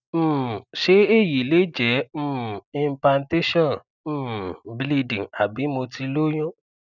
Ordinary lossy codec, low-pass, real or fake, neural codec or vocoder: none; 7.2 kHz; real; none